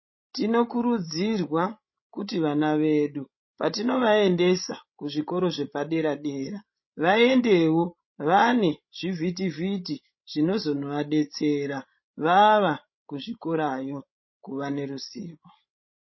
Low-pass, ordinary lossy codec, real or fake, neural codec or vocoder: 7.2 kHz; MP3, 24 kbps; fake; codec, 16 kHz, 16 kbps, FreqCodec, larger model